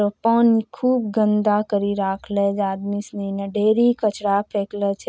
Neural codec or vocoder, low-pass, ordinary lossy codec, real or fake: none; none; none; real